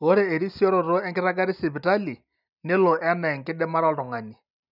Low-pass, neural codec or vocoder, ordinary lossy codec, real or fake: 5.4 kHz; none; none; real